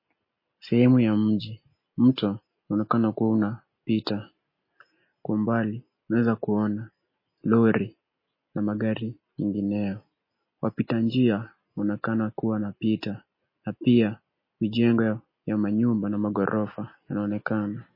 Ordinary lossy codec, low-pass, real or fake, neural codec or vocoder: MP3, 24 kbps; 5.4 kHz; real; none